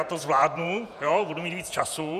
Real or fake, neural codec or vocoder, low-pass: fake; vocoder, 48 kHz, 128 mel bands, Vocos; 14.4 kHz